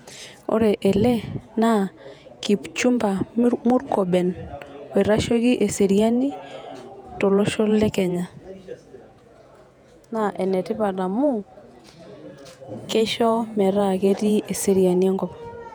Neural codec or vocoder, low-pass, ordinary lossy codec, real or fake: vocoder, 48 kHz, 128 mel bands, Vocos; 19.8 kHz; none; fake